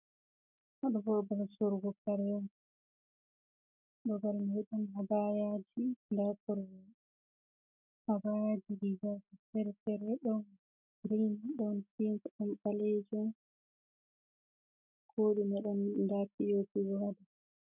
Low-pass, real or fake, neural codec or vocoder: 3.6 kHz; real; none